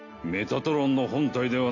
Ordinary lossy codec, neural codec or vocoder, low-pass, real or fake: AAC, 48 kbps; none; 7.2 kHz; real